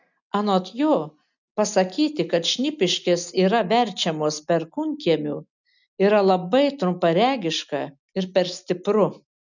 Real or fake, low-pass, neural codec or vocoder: real; 7.2 kHz; none